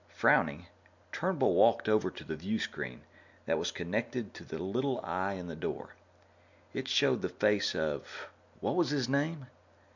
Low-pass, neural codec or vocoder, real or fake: 7.2 kHz; none; real